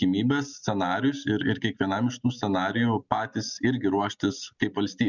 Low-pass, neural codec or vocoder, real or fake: 7.2 kHz; none; real